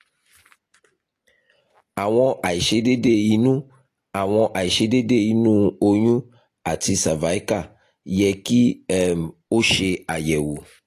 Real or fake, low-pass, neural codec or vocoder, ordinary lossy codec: real; 14.4 kHz; none; AAC, 48 kbps